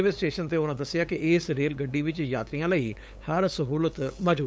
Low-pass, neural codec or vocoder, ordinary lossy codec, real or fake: none; codec, 16 kHz, 8 kbps, FunCodec, trained on LibriTTS, 25 frames a second; none; fake